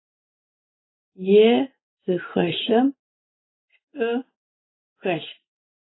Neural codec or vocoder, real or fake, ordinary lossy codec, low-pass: none; real; AAC, 16 kbps; 7.2 kHz